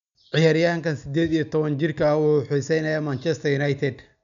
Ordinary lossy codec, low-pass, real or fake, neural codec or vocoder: none; 7.2 kHz; real; none